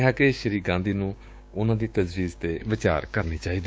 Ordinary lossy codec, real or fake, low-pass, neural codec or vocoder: none; fake; none; codec, 16 kHz, 6 kbps, DAC